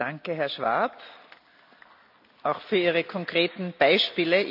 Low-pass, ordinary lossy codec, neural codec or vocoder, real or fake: 5.4 kHz; none; none; real